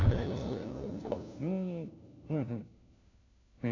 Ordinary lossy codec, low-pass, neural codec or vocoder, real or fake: none; 7.2 kHz; codec, 16 kHz, 1 kbps, FunCodec, trained on LibriTTS, 50 frames a second; fake